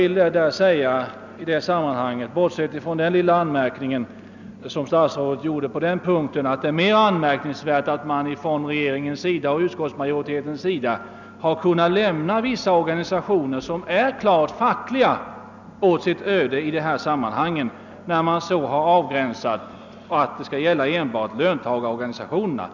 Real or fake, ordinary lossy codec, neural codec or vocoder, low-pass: real; none; none; 7.2 kHz